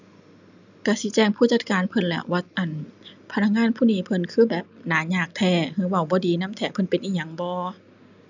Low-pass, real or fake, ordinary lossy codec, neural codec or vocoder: 7.2 kHz; real; none; none